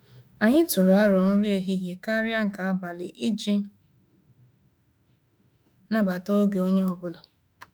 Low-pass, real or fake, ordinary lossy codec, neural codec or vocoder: none; fake; none; autoencoder, 48 kHz, 32 numbers a frame, DAC-VAE, trained on Japanese speech